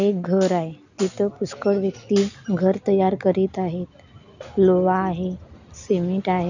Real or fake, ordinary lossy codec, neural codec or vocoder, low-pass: real; none; none; 7.2 kHz